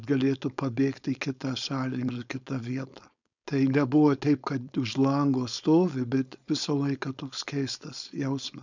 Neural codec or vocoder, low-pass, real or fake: codec, 16 kHz, 4.8 kbps, FACodec; 7.2 kHz; fake